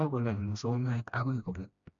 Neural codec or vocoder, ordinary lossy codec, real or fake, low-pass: codec, 16 kHz, 1 kbps, FreqCodec, smaller model; none; fake; 7.2 kHz